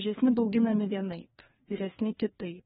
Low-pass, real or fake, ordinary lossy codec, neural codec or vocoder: 7.2 kHz; fake; AAC, 16 kbps; codec, 16 kHz, 2 kbps, FreqCodec, larger model